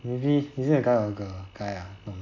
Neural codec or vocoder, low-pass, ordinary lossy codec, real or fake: none; 7.2 kHz; none; real